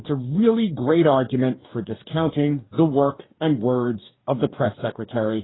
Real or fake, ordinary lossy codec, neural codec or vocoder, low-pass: fake; AAC, 16 kbps; codec, 44.1 kHz, 2.6 kbps, DAC; 7.2 kHz